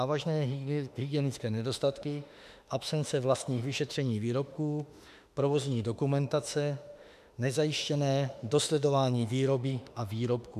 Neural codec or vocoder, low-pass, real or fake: autoencoder, 48 kHz, 32 numbers a frame, DAC-VAE, trained on Japanese speech; 14.4 kHz; fake